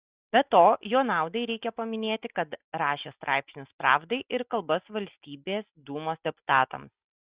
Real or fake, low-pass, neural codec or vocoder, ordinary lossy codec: real; 3.6 kHz; none; Opus, 64 kbps